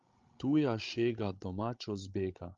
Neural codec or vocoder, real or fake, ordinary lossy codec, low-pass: codec, 16 kHz, 16 kbps, FreqCodec, larger model; fake; Opus, 32 kbps; 7.2 kHz